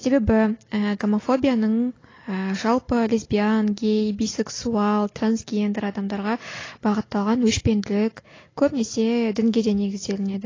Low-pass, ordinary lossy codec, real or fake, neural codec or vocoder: 7.2 kHz; AAC, 32 kbps; real; none